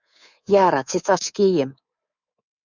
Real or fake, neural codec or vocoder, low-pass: fake; codec, 24 kHz, 3.1 kbps, DualCodec; 7.2 kHz